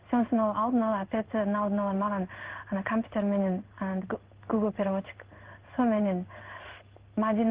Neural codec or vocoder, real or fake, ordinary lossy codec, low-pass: codec, 16 kHz in and 24 kHz out, 1 kbps, XY-Tokenizer; fake; Opus, 16 kbps; 3.6 kHz